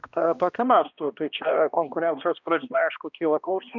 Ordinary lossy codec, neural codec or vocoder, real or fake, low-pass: MP3, 64 kbps; codec, 16 kHz, 1 kbps, X-Codec, HuBERT features, trained on balanced general audio; fake; 7.2 kHz